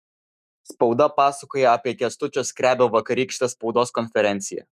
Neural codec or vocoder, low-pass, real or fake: autoencoder, 48 kHz, 128 numbers a frame, DAC-VAE, trained on Japanese speech; 14.4 kHz; fake